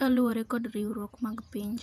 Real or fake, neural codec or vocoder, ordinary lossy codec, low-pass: fake; vocoder, 48 kHz, 128 mel bands, Vocos; none; 14.4 kHz